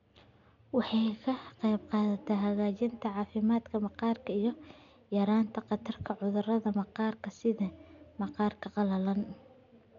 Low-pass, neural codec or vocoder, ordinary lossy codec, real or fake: 7.2 kHz; none; none; real